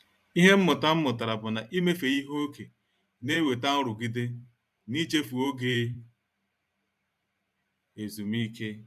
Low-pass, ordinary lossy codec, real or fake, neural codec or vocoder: 14.4 kHz; none; fake; vocoder, 44.1 kHz, 128 mel bands every 512 samples, BigVGAN v2